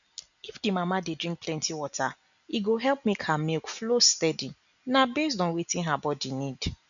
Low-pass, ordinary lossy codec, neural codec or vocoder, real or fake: 7.2 kHz; none; none; real